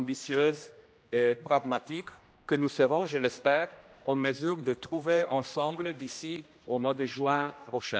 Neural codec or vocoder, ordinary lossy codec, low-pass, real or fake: codec, 16 kHz, 1 kbps, X-Codec, HuBERT features, trained on general audio; none; none; fake